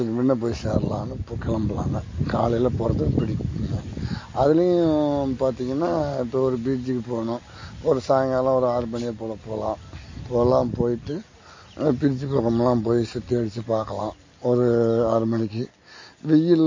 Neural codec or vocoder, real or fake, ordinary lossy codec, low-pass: none; real; MP3, 32 kbps; 7.2 kHz